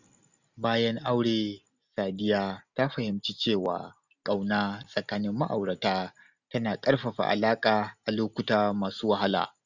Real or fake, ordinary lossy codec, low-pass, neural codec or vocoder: real; none; 7.2 kHz; none